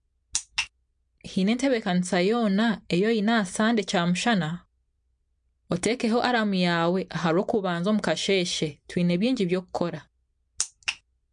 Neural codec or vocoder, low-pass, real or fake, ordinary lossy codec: none; 9.9 kHz; real; MP3, 64 kbps